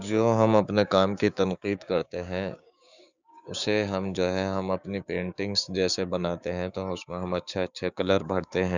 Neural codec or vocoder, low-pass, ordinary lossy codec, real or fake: codec, 16 kHz, 6 kbps, DAC; 7.2 kHz; none; fake